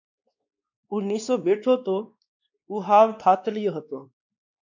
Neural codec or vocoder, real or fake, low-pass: codec, 16 kHz, 2 kbps, X-Codec, WavLM features, trained on Multilingual LibriSpeech; fake; 7.2 kHz